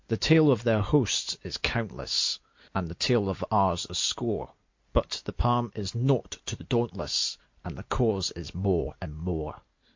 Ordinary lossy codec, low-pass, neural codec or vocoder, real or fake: MP3, 48 kbps; 7.2 kHz; none; real